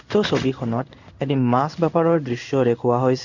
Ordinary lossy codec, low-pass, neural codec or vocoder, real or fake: none; 7.2 kHz; codec, 16 kHz in and 24 kHz out, 1 kbps, XY-Tokenizer; fake